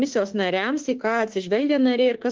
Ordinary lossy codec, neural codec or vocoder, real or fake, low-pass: Opus, 16 kbps; autoencoder, 48 kHz, 32 numbers a frame, DAC-VAE, trained on Japanese speech; fake; 7.2 kHz